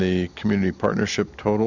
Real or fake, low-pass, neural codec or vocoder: real; 7.2 kHz; none